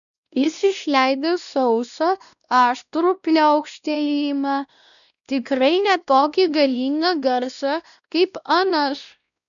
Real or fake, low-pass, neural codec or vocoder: fake; 7.2 kHz; codec, 16 kHz, 1 kbps, X-Codec, WavLM features, trained on Multilingual LibriSpeech